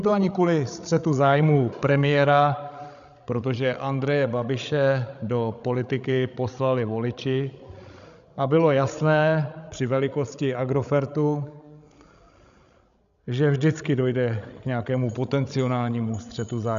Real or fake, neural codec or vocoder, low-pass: fake; codec, 16 kHz, 16 kbps, FreqCodec, larger model; 7.2 kHz